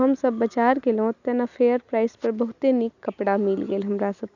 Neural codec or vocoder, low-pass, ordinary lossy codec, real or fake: none; 7.2 kHz; none; real